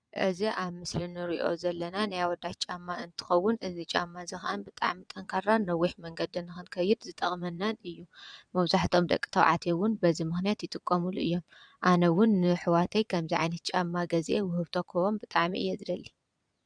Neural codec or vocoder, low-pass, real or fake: vocoder, 22.05 kHz, 80 mel bands, Vocos; 9.9 kHz; fake